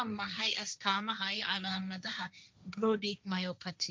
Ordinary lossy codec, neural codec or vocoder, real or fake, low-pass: none; codec, 16 kHz, 1.1 kbps, Voila-Tokenizer; fake; none